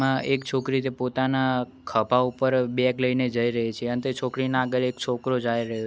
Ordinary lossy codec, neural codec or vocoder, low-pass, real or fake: none; none; none; real